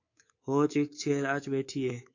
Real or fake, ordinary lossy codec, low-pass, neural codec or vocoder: fake; AAC, 48 kbps; 7.2 kHz; codec, 24 kHz, 3.1 kbps, DualCodec